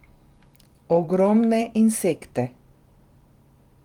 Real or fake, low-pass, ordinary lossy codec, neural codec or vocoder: fake; 19.8 kHz; Opus, 24 kbps; vocoder, 44.1 kHz, 128 mel bands every 512 samples, BigVGAN v2